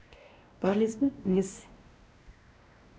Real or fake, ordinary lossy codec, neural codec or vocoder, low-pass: fake; none; codec, 16 kHz, 1 kbps, X-Codec, WavLM features, trained on Multilingual LibriSpeech; none